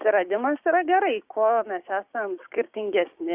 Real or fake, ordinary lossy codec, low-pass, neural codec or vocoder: fake; Opus, 64 kbps; 3.6 kHz; codec, 16 kHz, 6 kbps, DAC